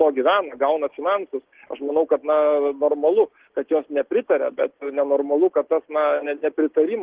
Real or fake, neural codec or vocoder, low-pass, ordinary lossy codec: real; none; 3.6 kHz; Opus, 16 kbps